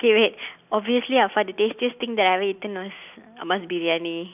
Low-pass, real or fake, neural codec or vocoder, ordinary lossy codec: 3.6 kHz; real; none; none